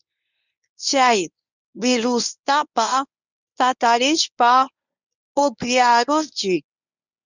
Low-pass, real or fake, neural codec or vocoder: 7.2 kHz; fake; codec, 24 kHz, 0.9 kbps, WavTokenizer, medium speech release version 1